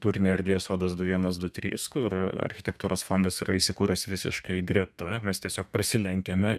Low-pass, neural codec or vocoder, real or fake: 14.4 kHz; codec, 32 kHz, 1.9 kbps, SNAC; fake